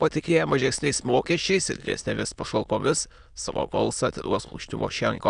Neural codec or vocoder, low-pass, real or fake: autoencoder, 22.05 kHz, a latent of 192 numbers a frame, VITS, trained on many speakers; 9.9 kHz; fake